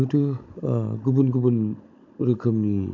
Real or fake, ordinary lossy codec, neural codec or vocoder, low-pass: fake; MP3, 64 kbps; codec, 16 kHz, 16 kbps, FunCodec, trained on Chinese and English, 50 frames a second; 7.2 kHz